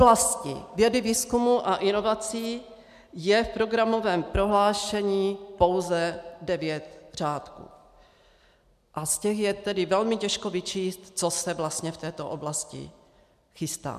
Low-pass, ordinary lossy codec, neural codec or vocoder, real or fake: 14.4 kHz; MP3, 96 kbps; none; real